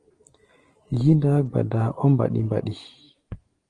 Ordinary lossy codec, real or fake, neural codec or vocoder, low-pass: Opus, 24 kbps; real; none; 9.9 kHz